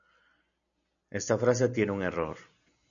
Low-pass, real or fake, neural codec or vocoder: 7.2 kHz; real; none